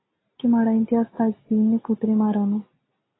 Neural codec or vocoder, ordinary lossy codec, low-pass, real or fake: none; AAC, 16 kbps; 7.2 kHz; real